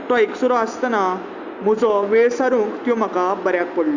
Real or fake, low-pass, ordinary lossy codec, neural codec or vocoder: real; 7.2 kHz; Opus, 64 kbps; none